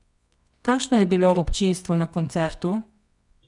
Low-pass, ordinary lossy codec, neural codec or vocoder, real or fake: 10.8 kHz; none; codec, 24 kHz, 0.9 kbps, WavTokenizer, medium music audio release; fake